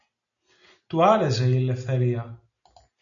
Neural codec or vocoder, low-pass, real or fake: none; 7.2 kHz; real